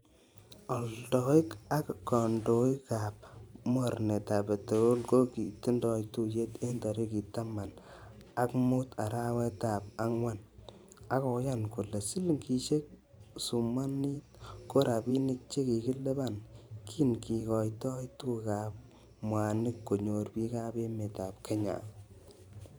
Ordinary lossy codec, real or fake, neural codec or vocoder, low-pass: none; fake; vocoder, 44.1 kHz, 128 mel bands every 512 samples, BigVGAN v2; none